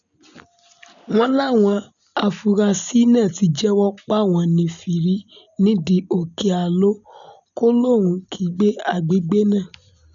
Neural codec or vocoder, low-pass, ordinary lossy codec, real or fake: none; 7.2 kHz; none; real